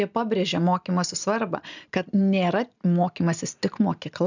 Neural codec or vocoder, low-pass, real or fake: none; 7.2 kHz; real